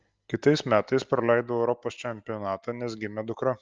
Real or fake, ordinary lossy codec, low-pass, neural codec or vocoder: real; Opus, 24 kbps; 7.2 kHz; none